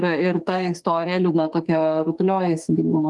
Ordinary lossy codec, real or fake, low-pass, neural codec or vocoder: Opus, 24 kbps; fake; 10.8 kHz; autoencoder, 48 kHz, 32 numbers a frame, DAC-VAE, trained on Japanese speech